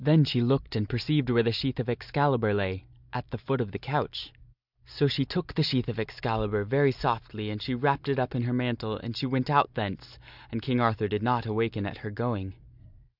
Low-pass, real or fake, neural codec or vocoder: 5.4 kHz; real; none